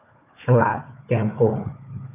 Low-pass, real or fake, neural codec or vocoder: 3.6 kHz; fake; codec, 16 kHz, 4 kbps, FunCodec, trained on Chinese and English, 50 frames a second